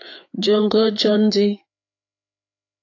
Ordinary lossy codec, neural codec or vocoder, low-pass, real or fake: AAC, 48 kbps; codec, 16 kHz, 4 kbps, FreqCodec, larger model; 7.2 kHz; fake